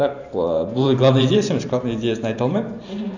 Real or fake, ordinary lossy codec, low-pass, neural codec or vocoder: fake; none; 7.2 kHz; vocoder, 44.1 kHz, 128 mel bands every 512 samples, BigVGAN v2